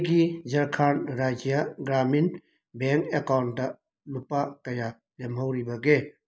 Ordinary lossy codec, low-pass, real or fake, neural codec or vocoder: none; none; real; none